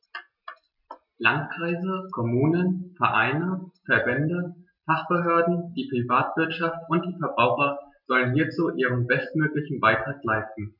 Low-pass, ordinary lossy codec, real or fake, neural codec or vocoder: 5.4 kHz; none; real; none